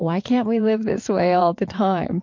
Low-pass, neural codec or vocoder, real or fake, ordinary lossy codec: 7.2 kHz; vocoder, 22.05 kHz, 80 mel bands, WaveNeXt; fake; MP3, 48 kbps